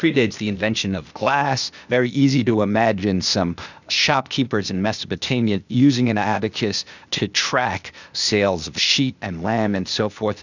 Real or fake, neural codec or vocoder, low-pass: fake; codec, 16 kHz, 0.8 kbps, ZipCodec; 7.2 kHz